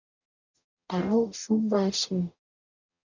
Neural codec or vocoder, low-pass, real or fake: codec, 44.1 kHz, 0.9 kbps, DAC; 7.2 kHz; fake